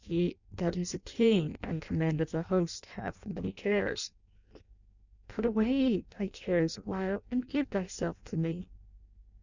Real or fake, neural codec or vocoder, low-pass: fake; codec, 16 kHz in and 24 kHz out, 0.6 kbps, FireRedTTS-2 codec; 7.2 kHz